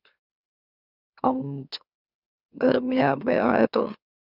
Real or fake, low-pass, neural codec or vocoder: fake; 5.4 kHz; autoencoder, 44.1 kHz, a latent of 192 numbers a frame, MeloTTS